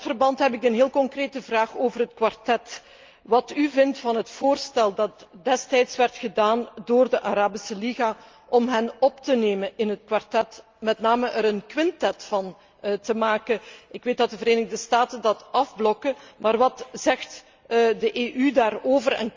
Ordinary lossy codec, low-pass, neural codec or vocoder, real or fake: Opus, 32 kbps; 7.2 kHz; none; real